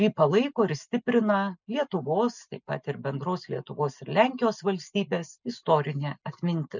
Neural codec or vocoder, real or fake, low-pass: none; real; 7.2 kHz